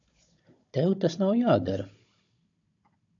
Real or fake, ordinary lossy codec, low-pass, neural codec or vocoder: fake; AAC, 64 kbps; 7.2 kHz; codec, 16 kHz, 16 kbps, FunCodec, trained on Chinese and English, 50 frames a second